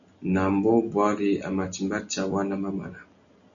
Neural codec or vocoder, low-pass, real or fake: none; 7.2 kHz; real